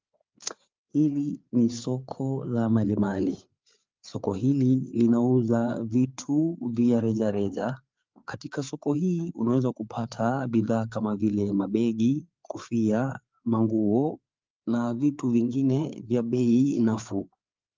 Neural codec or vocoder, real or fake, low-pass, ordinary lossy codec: codec, 16 kHz, 4 kbps, FreqCodec, larger model; fake; 7.2 kHz; Opus, 24 kbps